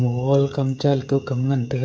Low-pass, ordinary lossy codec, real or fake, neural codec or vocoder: none; none; fake; codec, 16 kHz, 16 kbps, FreqCodec, smaller model